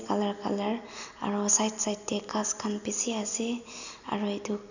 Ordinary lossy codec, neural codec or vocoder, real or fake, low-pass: none; none; real; 7.2 kHz